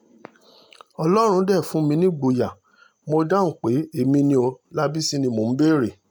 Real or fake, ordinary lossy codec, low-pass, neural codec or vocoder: real; none; none; none